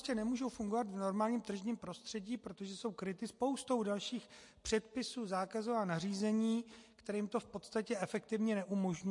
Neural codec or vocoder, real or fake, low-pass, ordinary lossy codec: none; real; 10.8 kHz; MP3, 48 kbps